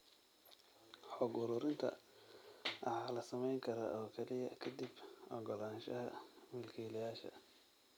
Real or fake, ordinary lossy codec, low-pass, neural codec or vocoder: real; none; none; none